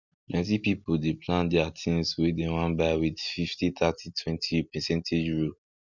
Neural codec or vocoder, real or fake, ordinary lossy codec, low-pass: none; real; none; 7.2 kHz